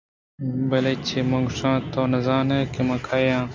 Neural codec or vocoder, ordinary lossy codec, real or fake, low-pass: none; MP3, 48 kbps; real; 7.2 kHz